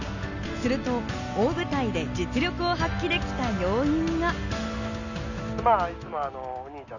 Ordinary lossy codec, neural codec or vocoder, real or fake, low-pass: none; none; real; 7.2 kHz